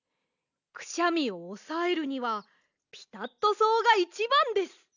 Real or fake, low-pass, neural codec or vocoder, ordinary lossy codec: real; 7.2 kHz; none; none